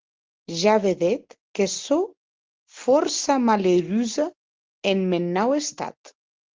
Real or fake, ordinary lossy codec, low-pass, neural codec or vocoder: real; Opus, 16 kbps; 7.2 kHz; none